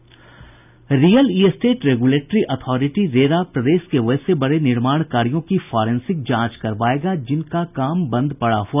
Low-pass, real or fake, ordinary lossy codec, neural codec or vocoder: 3.6 kHz; real; none; none